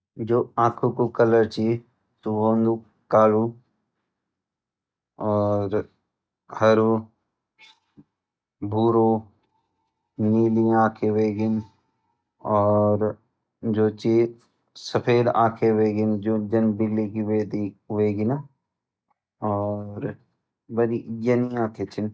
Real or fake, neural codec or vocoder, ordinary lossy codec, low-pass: real; none; none; none